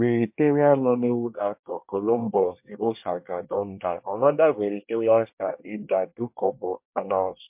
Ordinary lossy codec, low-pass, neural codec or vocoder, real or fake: MP3, 32 kbps; 3.6 kHz; codec, 24 kHz, 1 kbps, SNAC; fake